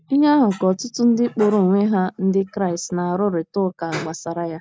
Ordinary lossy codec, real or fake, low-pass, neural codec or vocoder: none; real; none; none